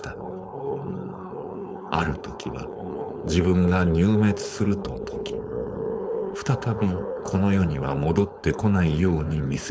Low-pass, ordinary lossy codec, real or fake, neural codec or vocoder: none; none; fake; codec, 16 kHz, 4.8 kbps, FACodec